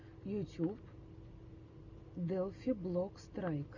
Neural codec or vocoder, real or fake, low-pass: none; real; 7.2 kHz